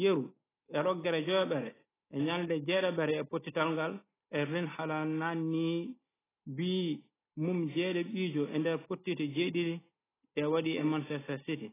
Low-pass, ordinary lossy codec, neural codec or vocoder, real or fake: 3.6 kHz; AAC, 16 kbps; none; real